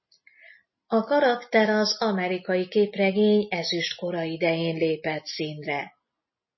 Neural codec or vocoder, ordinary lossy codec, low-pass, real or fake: none; MP3, 24 kbps; 7.2 kHz; real